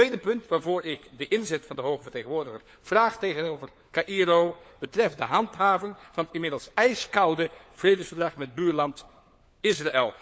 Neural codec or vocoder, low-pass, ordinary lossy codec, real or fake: codec, 16 kHz, 4 kbps, FunCodec, trained on LibriTTS, 50 frames a second; none; none; fake